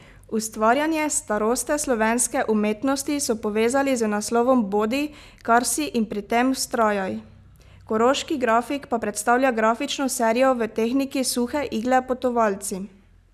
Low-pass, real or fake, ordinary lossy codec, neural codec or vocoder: 14.4 kHz; real; none; none